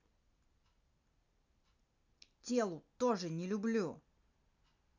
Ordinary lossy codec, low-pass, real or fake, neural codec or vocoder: none; 7.2 kHz; real; none